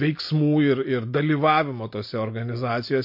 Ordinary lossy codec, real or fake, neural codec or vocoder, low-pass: MP3, 32 kbps; real; none; 5.4 kHz